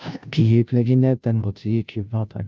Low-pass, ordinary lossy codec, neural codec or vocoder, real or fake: none; none; codec, 16 kHz, 0.5 kbps, FunCodec, trained on Chinese and English, 25 frames a second; fake